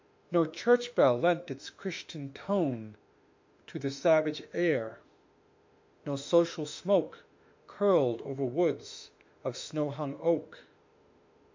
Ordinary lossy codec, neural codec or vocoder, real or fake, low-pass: MP3, 48 kbps; autoencoder, 48 kHz, 32 numbers a frame, DAC-VAE, trained on Japanese speech; fake; 7.2 kHz